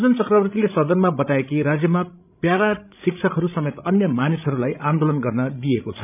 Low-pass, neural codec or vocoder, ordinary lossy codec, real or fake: 3.6 kHz; codec, 16 kHz, 16 kbps, FreqCodec, larger model; none; fake